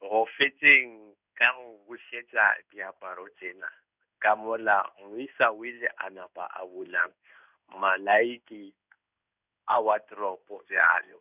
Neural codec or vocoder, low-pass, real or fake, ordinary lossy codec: codec, 16 kHz in and 24 kHz out, 1 kbps, XY-Tokenizer; 3.6 kHz; fake; none